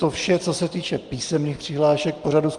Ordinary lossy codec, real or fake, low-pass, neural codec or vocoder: Opus, 24 kbps; real; 9.9 kHz; none